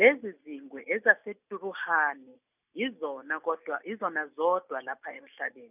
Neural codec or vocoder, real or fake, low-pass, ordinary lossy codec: none; real; 3.6 kHz; none